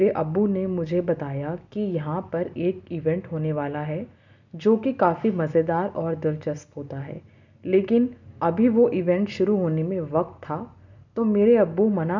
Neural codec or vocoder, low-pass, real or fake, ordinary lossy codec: none; 7.2 kHz; real; none